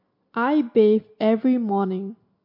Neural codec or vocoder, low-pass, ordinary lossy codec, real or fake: none; 5.4 kHz; MP3, 32 kbps; real